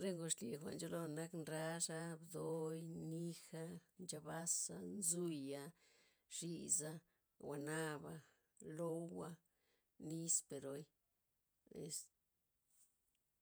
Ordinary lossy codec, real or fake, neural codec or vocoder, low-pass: none; fake; vocoder, 48 kHz, 128 mel bands, Vocos; none